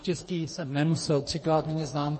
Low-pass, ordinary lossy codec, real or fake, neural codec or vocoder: 10.8 kHz; MP3, 32 kbps; fake; codec, 44.1 kHz, 2.6 kbps, DAC